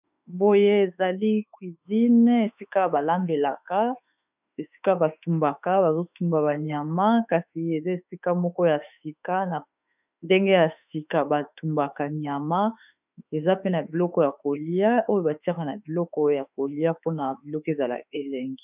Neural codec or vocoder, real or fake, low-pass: autoencoder, 48 kHz, 32 numbers a frame, DAC-VAE, trained on Japanese speech; fake; 3.6 kHz